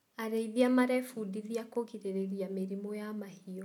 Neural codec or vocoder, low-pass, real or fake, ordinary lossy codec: none; 19.8 kHz; real; none